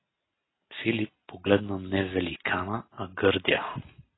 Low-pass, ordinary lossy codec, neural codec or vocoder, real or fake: 7.2 kHz; AAC, 16 kbps; none; real